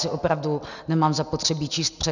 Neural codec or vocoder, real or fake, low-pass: none; real; 7.2 kHz